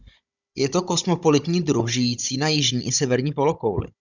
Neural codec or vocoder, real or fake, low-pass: codec, 16 kHz, 16 kbps, FunCodec, trained on Chinese and English, 50 frames a second; fake; 7.2 kHz